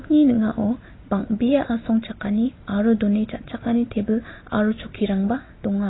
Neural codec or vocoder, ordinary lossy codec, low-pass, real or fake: none; AAC, 16 kbps; 7.2 kHz; real